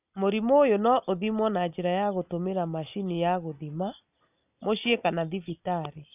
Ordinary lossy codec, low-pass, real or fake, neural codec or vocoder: none; 3.6 kHz; real; none